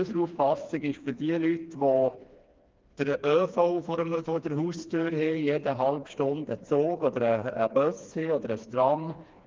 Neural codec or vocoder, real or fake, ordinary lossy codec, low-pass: codec, 16 kHz, 2 kbps, FreqCodec, smaller model; fake; Opus, 32 kbps; 7.2 kHz